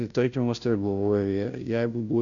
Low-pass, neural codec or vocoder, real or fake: 7.2 kHz; codec, 16 kHz, 0.5 kbps, FunCodec, trained on Chinese and English, 25 frames a second; fake